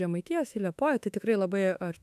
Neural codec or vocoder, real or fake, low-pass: autoencoder, 48 kHz, 32 numbers a frame, DAC-VAE, trained on Japanese speech; fake; 14.4 kHz